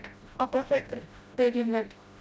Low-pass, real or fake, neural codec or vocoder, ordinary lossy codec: none; fake; codec, 16 kHz, 0.5 kbps, FreqCodec, smaller model; none